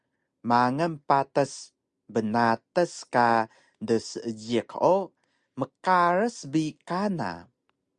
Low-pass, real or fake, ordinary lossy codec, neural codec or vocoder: 9.9 kHz; real; Opus, 64 kbps; none